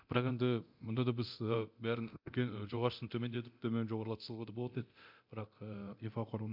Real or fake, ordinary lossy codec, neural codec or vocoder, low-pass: fake; MP3, 48 kbps; codec, 24 kHz, 0.9 kbps, DualCodec; 5.4 kHz